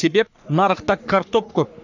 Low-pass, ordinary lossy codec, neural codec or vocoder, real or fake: 7.2 kHz; none; codec, 44.1 kHz, 3.4 kbps, Pupu-Codec; fake